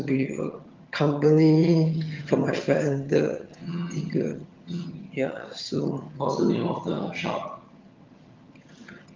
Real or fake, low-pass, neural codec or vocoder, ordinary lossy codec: fake; 7.2 kHz; vocoder, 22.05 kHz, 80 mel bands, HiFi-GAN; Opus, 32 kbps